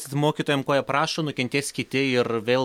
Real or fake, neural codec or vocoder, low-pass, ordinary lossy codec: real; none; 19.8 kHz; MP3, 96 kbps